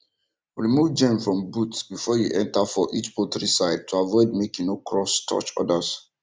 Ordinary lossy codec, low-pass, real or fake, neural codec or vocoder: none; none; real; none